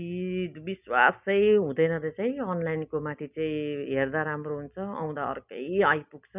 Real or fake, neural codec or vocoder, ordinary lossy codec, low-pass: real; none; none; 3.6 kHz